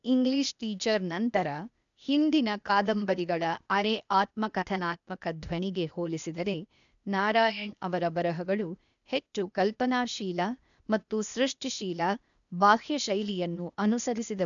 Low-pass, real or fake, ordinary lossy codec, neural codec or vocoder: 7.2 kHz; fake; none; codec, 16 kHz, 0.8 kbps, ZipCodec